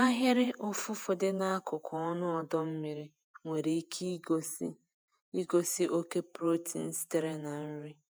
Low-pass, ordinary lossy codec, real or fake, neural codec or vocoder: none; none; fake; vocoder, 48 kHz, 128 mel bands, Vocos